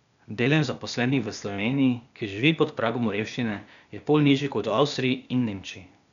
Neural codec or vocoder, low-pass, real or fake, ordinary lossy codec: codec, 16 kHz, 0.8 kbps, ZipCodec; 7.2 kHz; fake; none